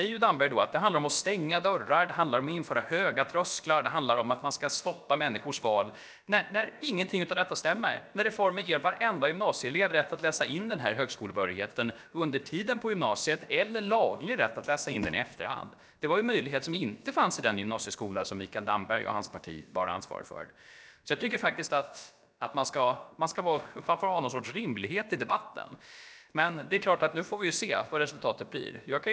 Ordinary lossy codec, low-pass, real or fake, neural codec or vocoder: none; none; fake; codec, 16 kHz, about 1 kbps, DyCAST, with the encoder's durations